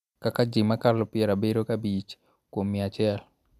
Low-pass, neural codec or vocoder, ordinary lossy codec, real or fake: 14.4 kHz; none; none; real